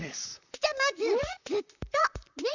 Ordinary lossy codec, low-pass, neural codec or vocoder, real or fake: none; 7.2 kHz; vocoder, 44.1 kHz, 128 mel bands, Pupu-Vocoder; fake